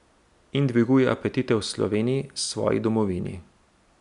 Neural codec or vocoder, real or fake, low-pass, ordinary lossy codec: none; real; 10.8 kHz; none